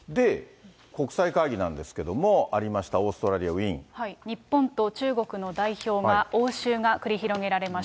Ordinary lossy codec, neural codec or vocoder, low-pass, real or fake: none; none; none; real